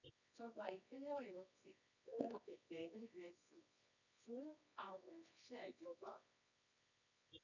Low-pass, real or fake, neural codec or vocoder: 7.2 kHz; fake; codec, 24 kHz, 0.9 kbps, WavTokenizer, medium music audio release